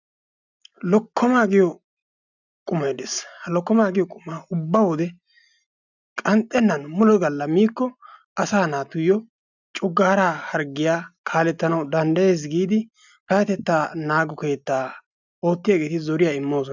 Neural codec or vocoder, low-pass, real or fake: none; 7.2 kHz; real